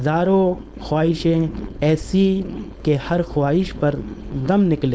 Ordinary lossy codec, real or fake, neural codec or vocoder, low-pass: none; fake; codec, 16 kHz, 4.8 kbps, FACodec; none